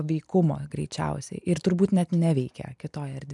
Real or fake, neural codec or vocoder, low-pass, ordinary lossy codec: real; none; 10.8 kHz; MP3, 96 kbps